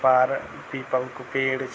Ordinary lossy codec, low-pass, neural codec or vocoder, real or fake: none; none; none; real